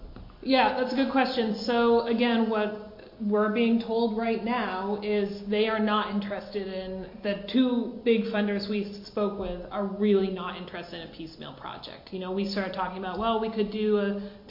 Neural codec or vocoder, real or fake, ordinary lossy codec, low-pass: none; real; MP3, 32 kbps; 5.4 kHz